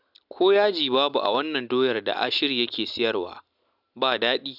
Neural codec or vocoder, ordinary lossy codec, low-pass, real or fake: none; none; 5.4 kHz; real